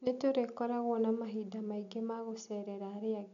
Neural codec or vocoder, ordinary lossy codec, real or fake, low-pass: none; none; real; 7.2 kHz